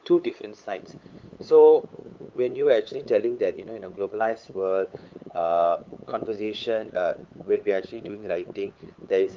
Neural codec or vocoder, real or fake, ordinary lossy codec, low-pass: codec, 16 kHz, 8 kbps, FunCodec, trained on LibriTTS, 25 frames a second; fake; Opus, 32 kbps; 7.2 kHz